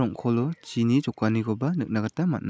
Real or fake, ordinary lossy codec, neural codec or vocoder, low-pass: real; none; none; none